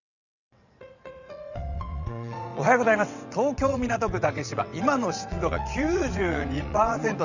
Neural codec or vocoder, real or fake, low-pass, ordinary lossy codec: vocoder, 22.05 kHz, 80 mel bands, WaveNeXt; fake; 7.2 kHz; none